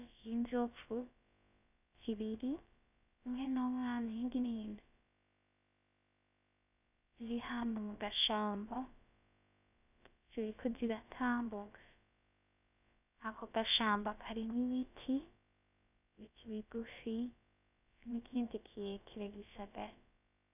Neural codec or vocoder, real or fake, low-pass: codec, 16 kHz, about 1 kbps, DyCAST, with the encoder's durations; fake; 3.6 kHz